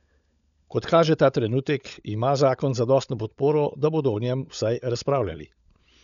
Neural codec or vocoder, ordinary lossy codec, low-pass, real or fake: codec, 16 kHz, 16 kbps, FunCodec, trained on LibriTTS, 50 frames a second; none; 7.2 kHz; fake